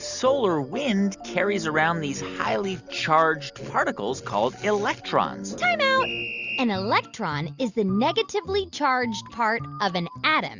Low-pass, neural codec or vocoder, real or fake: 7.2 kHz; none; real